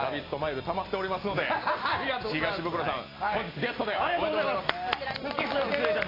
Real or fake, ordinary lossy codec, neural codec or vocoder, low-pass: real; AAC, 24 kbps; none; 5.4 kHz